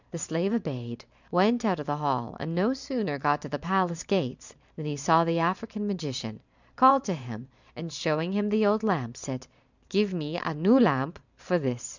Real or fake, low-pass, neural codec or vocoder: fake; 7.2 kHz; vocoder, 44.1 kHz, 128 mel bands every 512 samples, BigVGAN v2